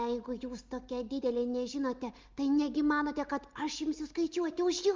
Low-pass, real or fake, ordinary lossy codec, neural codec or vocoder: 7.2 kHz; real; Opus, 24 kbps; none